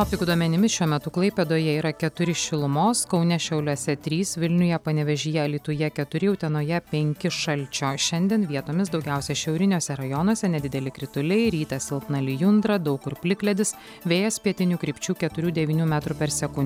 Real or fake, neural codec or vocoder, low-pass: real; none; 19.8 kHz